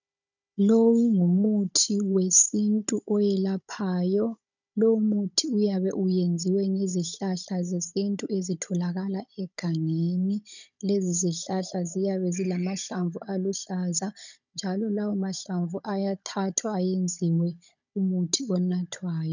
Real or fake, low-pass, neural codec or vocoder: fake; 7.2 kHz; codec, 16 kHz, 16 kbps, FunCodec, trained on Chinese and English, 50 frames a second